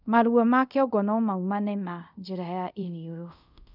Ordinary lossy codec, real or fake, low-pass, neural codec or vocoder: none; fake; 5.4 kHz; codec, 24 kHz, 0.5 kbps, DualCodec